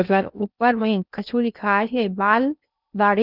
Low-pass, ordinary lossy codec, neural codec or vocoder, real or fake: 5.4 kHz; none; codec, 16 kHz in and 24 kHz out, 0.6 kbps, FocalCodec, streaming, 2048 codes; fake